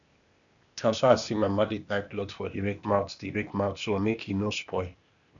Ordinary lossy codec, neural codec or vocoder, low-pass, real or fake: none; codec, 16 kHz, 0.8 kbps, ZipCodec; 7.2 kHz; fake